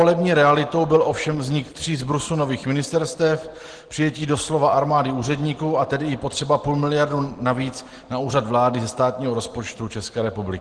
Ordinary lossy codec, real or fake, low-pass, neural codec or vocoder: Opus, 16 kbps; real; 9.9 kHz; none